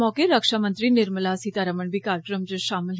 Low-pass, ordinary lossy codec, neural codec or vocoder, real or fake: none; none; none; real